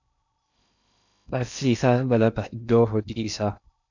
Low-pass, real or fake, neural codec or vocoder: 7.2 kHz; fake; codec, 16 kHz in and 24 kHz out, 0.6 kbps, FocalCodec, streaming, 2048 codes